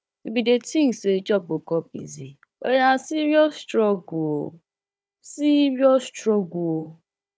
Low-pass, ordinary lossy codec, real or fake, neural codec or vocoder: none; none; fake; codec, 16 kHz, 4 kbps, FunCodec, trained on Chinese and English, 50 frames a second